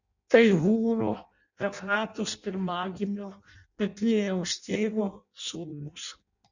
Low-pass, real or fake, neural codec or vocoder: 7.2 kHz; fake; codec, 16 kHz in and 24 kHz out, 0.6 kbps, FireRedTTS-2 codec